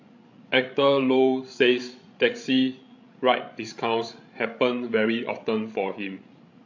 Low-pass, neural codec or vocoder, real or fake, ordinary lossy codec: 7.2 kHz; codec, 16 kHz, 16 kbps, FreqCodec, larger model; fake; AAC, 48 kbps